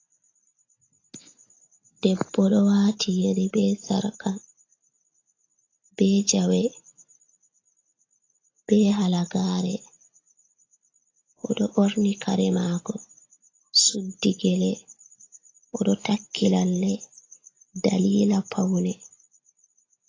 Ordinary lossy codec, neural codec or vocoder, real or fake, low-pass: AAC, 32 kbps; none; real; 7.2 kHz